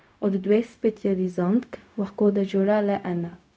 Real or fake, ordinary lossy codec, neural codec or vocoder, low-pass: fake; none; codec, 16 kHz, 0.4 kbps, LongCat-Audio-Codec; none